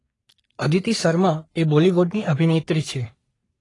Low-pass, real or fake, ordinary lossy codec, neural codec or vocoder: 10.8 kHz; fake; AAC, 32 kbps; codec, 44.1 kHz, 3.4 kbps, Pupu-Codec